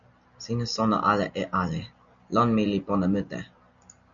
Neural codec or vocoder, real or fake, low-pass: none; real; 7.2 kHz